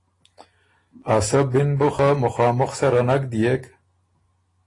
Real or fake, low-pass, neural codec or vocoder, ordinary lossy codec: real; 10.8 kHz; none; AAC, 32 kbps